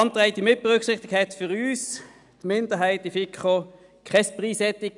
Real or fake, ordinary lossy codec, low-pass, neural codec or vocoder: real; none; 10.8 kHz; none